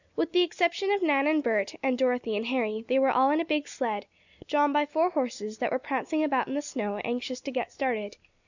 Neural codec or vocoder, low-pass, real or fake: none; 7.2 kHz; real